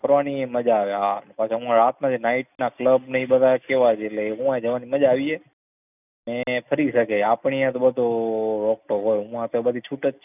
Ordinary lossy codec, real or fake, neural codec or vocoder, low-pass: none; real; none; 3.6 kHz